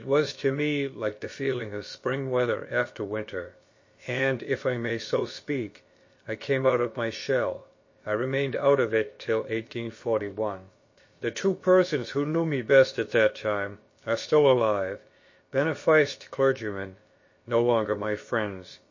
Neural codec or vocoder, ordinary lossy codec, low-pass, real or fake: codec, 16 kHz, about 1 kbps, DyCAST, with the encoder's durations; MP3, 32 kbps; 7.2 kHz; fake